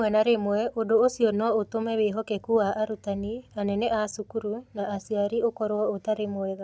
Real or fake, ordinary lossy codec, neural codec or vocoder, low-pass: real; none; none; none